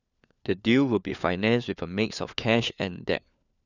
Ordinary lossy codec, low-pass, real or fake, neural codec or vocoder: none; 7.2 kHz; fake; codec, 16 kHz, 4 kbps, FunCodec, trained on LibriTTS, 50 frames a second